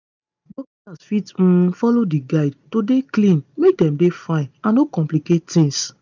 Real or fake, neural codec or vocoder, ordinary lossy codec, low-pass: real; none; none; 7.2 kHz